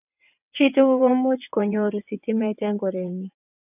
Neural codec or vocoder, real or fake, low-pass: vocoder, 22.05 kHz, 80 mel bands, WaveNeXt; fake; 3.6 kHz